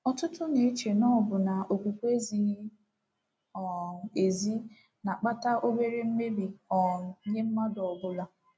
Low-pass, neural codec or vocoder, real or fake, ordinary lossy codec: none; none; real; none